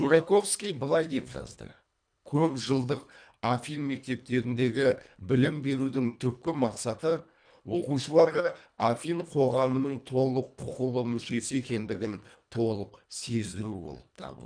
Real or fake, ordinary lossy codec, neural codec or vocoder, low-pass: fake; none; codec, 24 kHz, 1.5 kbps, HILCodec; 9.9 kHz